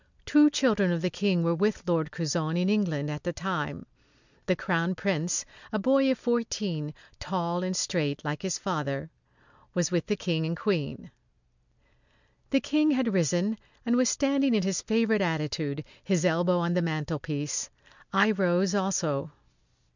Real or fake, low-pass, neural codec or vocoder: real; 7.2 kHz; none